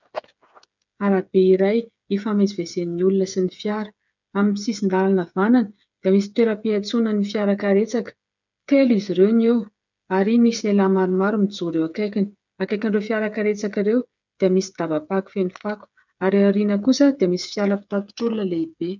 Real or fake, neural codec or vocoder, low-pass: fake; codec, 16 kHz, 8 kbps, FreqCodec, smaller model; 7.2 kHz